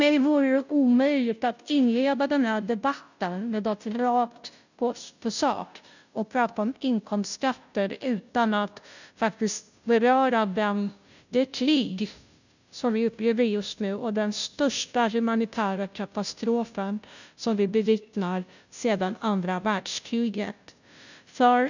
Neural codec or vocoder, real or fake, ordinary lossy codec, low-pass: codec, 16 kHz, 0.5 kbps, FunCodec, trained on Chinese and English, 25 frames a second; fake; none; 7.2 kHz